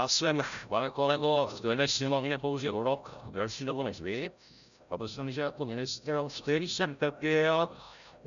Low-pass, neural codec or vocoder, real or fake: 7.2 kHz; codec, 16 kHz, 0.5 kbps, FreqCodec, larger model; fake